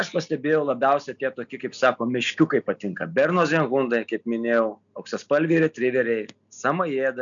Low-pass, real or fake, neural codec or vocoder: 7.2 kHz; real; none